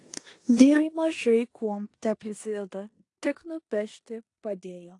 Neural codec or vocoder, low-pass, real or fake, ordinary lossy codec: codec, 16 kHz in and 24 kHz out, 0.9 kbps, LongCat-Audio-Codec, four codebook decoder; 10.8 kHz; fake; AAC, 48 kbps